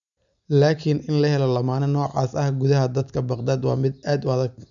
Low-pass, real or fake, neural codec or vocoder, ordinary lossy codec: 7.2 kHz; real; none; none